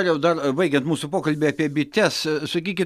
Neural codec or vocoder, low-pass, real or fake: none; 14.4 kHz; real